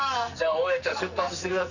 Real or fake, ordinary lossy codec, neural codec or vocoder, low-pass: fake; none; codec, 44.1 kHz, 2.6 kbps, SNAC; 7.2 kHz